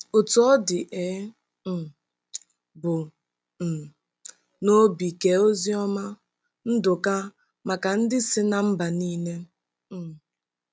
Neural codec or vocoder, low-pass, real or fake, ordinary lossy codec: none; none; real; none